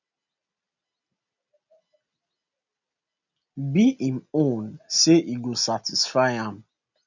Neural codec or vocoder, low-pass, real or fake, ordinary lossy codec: none; 7.2 kHz; real; none